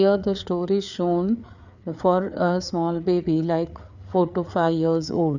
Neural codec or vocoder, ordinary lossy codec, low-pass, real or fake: codec, 16 kHz, 4 kbps, FreqCodec, larger model; none; 7.2 kHz; fake